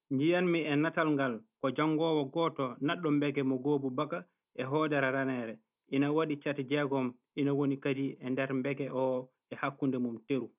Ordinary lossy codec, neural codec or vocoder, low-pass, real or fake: none; none; 3.6 kHz; real